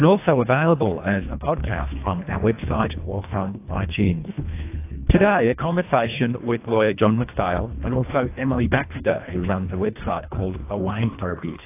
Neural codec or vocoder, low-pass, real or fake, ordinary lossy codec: codec, 24 kHz, 1.5 kbps, HILCodec; 3.6 kHz; fake; AAC, 24 kbps